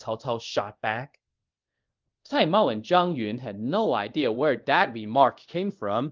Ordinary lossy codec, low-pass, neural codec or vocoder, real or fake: Opus, 32 kbps; 7.2 kHz; codec, 24 kHz, 0.5 kbps, DualCodec; fake